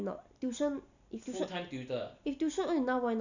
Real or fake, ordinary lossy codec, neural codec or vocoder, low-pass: real; none; none; 7.2 kHz